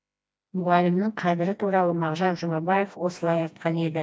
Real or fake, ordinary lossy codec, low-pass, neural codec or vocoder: fake; none; none; codec, 16 kHz, 1 kbps, FreqCodec, smaller model